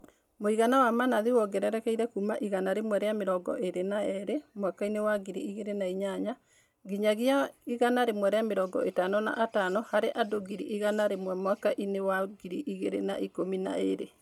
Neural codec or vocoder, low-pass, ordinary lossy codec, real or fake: none; 19.8 kHz; none; real